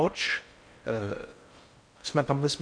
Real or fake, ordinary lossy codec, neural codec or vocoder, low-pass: fake; MP3, 64 kbps; codec, 16 kHz in and 24 kHz out, 0.6 kbps, FocalCodec, streaming, 4096 codes; 9.9 kHz